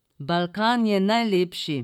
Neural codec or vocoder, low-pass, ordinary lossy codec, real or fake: vocoder, 44.1 kHz, 128 mel bands, Pupu-Vocoder; 19.8 kHz; none; fake